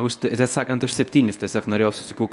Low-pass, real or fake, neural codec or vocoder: 10.8 kHz; fake; codec, 24 kHz, 0.9 kbps, WavTokenizer, medium speech release version 1